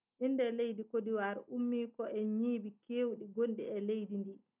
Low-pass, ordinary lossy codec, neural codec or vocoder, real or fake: 3.6 kHz; none; none; real